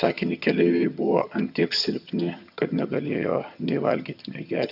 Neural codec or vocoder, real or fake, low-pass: vocoder, 22.05 kHz, 80 mel bands, HiFi-GAN; fake; 5.4 kHz